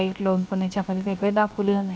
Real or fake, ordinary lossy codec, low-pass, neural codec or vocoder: fake; none; none; codec, 16 kHz, 0.7 kbps, FocalCodec